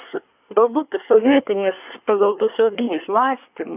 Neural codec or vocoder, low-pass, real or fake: codec, 24 kHz, 1 kbps, SNAC; 3.6 kHz; fake